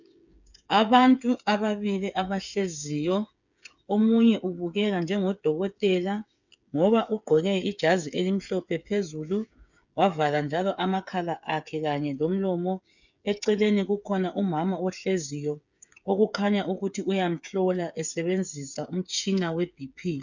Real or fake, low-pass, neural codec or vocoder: fake; 7.2 kHz; codec, 16 kHz, 8 kbps, FreqCodec, smaller model